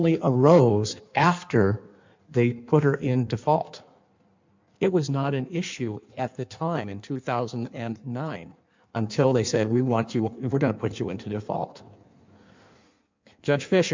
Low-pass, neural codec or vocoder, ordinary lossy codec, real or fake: 7.2 kHz; codec, 16 kHz in and 24 kHz out, 1.1 kbps, FireRedTTS-2 codec; MP3, 64 kbps; fake